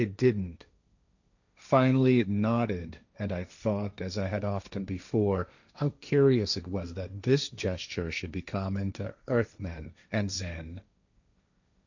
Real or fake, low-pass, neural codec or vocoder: fake; 7.2 kHz; codec, 16 kHz, 1.1 kbps, Voila-Tokenizer